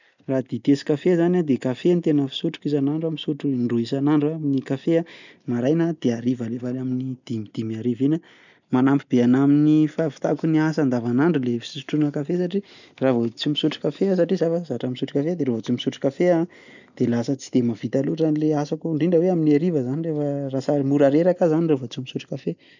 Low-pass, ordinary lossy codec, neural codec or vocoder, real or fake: 7.2 kHz; none; none; real